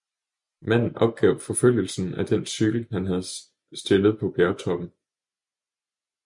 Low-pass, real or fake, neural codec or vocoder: 10.8 kHz; real; none